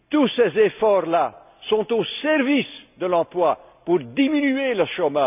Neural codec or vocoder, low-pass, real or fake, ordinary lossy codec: none; 3.6 kHz; real; none